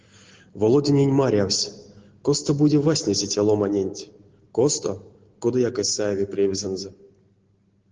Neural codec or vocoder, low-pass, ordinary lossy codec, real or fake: none; 7.2 kHz; Opus, 16 kbps; real